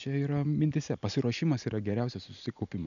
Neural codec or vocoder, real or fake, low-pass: none; real; 7.2 kHz